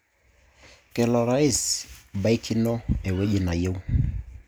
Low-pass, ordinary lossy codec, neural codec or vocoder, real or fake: none; none; none; real